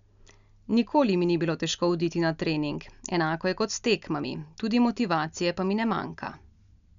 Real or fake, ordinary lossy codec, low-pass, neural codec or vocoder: real; none; 7.2 kHz; none